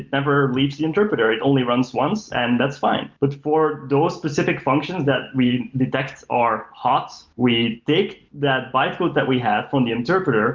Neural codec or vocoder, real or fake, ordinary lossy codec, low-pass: none; real; Opus, 24 kbps; 7.2 kHz